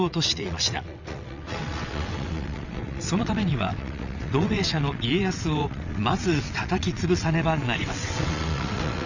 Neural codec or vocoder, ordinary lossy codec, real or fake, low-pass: vocoder, 22.05 kHz, 80 mel bands, WaveNeXt; none; fake; 7.2 kHz